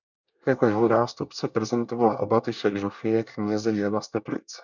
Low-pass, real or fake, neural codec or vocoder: 7.2 kHz; fake; codec, 24 kHz, 1 kbps, SNAC